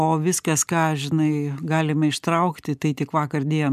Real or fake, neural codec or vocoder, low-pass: real; none; 14.4 kHz